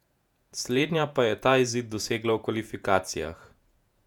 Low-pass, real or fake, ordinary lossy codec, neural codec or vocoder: 19.8 kHz; fake; none; vocoder, 48 kHz, 128 mel bands, Vocos